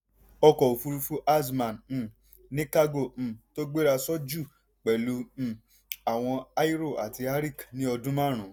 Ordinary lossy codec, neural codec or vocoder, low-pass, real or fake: none; none; none; real